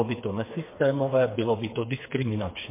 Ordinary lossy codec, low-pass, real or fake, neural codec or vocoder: MP3, 24 kbps; 3.6 kHz; fake; codec, 16 kHz, 4 kbps, FreqCodec, smaller model